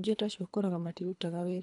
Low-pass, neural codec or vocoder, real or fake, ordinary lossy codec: 10.8 kHz; codec, 24 kHz, 3 kbps, HILCodec; fake; none